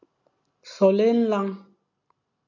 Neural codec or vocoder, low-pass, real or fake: none; 7.2 kHz; real